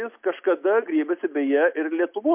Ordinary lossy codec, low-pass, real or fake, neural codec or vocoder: MP3, 32 kbps; 3.6 kHz; real; none